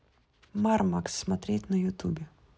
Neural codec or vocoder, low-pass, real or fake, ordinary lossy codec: none; none; real; none